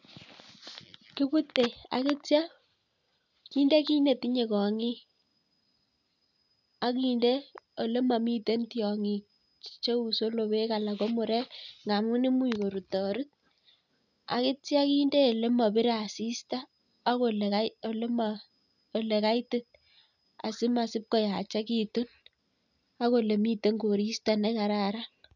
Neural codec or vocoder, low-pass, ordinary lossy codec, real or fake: none; 7.2 kHz; none; real